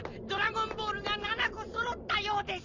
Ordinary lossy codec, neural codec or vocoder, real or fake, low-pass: none; none; real; 7.2 kHz